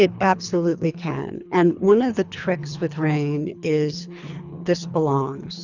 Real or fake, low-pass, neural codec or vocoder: fake; 7.2 kHz; codec, 24 kHz, 3 kbps, HILCodec